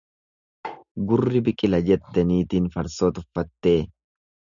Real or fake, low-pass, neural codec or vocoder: real; 7.2 kHz; none